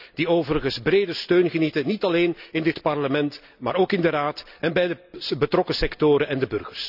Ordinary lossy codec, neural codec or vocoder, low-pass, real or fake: none; none; 5.4 kHz; real